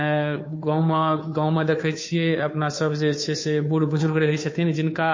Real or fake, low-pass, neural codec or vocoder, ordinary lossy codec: fake; 7.2 kHz; codec, 16 kHz, 2 kbps, FunCodec, trained on Chinese and English, 25 frames a second; MP3, 32 kbps